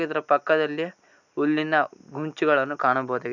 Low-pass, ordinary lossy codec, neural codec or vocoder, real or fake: 7.2 kHz; none; none; real